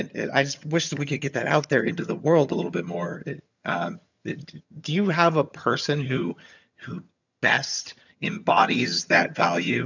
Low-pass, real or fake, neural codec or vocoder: 7.2 kHz; fake; vocoder, 22.05 kHz, 80 mel bands, HiFi-GAN